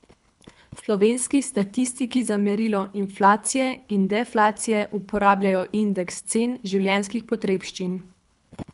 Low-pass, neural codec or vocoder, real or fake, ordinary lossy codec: 10.8 kHz; codec, 24 kHz, 3 kbps, HILCodec; fake; none